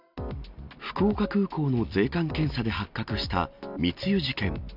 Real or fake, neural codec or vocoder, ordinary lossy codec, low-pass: real; none; none; 5.4 kHz